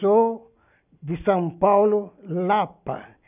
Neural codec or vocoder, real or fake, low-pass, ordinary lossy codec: none; real; 3.6 kHz; none